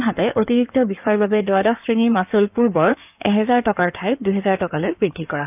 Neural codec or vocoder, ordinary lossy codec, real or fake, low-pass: codec, 16 kHz in and 24 kHz out, 2.2 kbps, FireRedTTS-2 codec; none; fake; 3.6 kHz